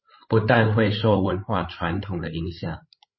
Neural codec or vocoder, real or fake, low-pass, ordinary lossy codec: codec, 16 kHz, 8 kbps, FreqCodec, larger model; fake; 7.2 kHz; MP3, 24 kbps